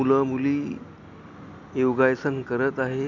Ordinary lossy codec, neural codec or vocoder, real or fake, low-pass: none; none; real; 7.2 kHz